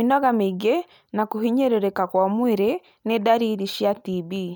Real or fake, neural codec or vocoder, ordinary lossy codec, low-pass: real; none; none; none